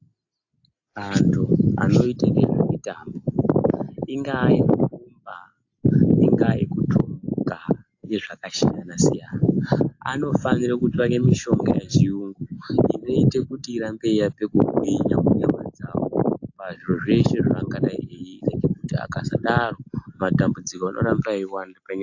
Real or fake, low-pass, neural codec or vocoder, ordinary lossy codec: real; 7.2 kHz; none; AAC, 48 kbps